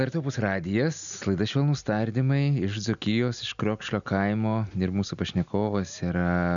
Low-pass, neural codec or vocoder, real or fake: 7.2 kHz; none; real